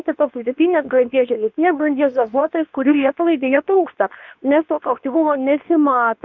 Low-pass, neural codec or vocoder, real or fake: 7.2 kHz; codec, 24 kHz, 0.9 kbps, WavTokenizer, medium speech release version 2; fake